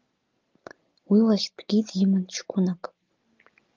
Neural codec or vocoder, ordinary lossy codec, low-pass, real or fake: none; Opus, 32 kbps; 7.2 kHz; real